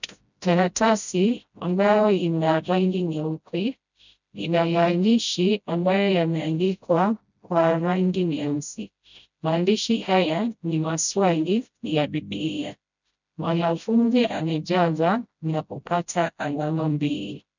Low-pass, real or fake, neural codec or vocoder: 7.2 kHz; fake; codec, 16 kHz, 0.5 kbps, FreqCodec, smaller model